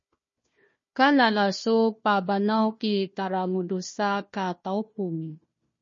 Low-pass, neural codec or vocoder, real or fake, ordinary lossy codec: 7.2 kHz; codec, 16 kHz, 1 kbps, FunCodec, trained on Chinese and English, 50 frames a second; fake; MP3, 32 kbps